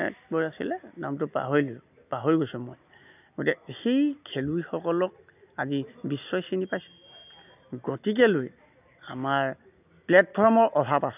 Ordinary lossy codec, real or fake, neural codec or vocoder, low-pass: none; real; none; 3.6 kHz